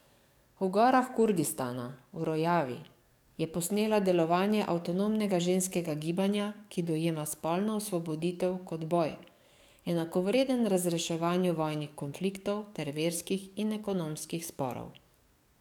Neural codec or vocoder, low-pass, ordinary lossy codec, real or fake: codec, 44.1 kHz, 7.8 kbps, DAC; 19.8 kHz; none; fake